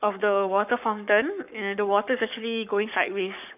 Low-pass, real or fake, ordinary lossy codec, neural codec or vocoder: 3.6 kHz; fake; none; codec, 44.1 kHz, 7.8 kbps, Pupu-Codec